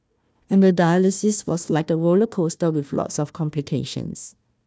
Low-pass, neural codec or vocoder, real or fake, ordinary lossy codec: none; codec, 16 kHz, 1 kbps, FunCodec, trained on Chinese and English, 50 frames a second; fake; none